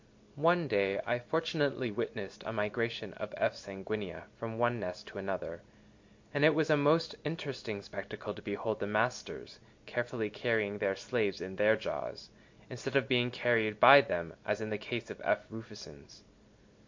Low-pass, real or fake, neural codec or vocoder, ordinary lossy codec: 7.2 kHz; real; none; MP3, 48 kbps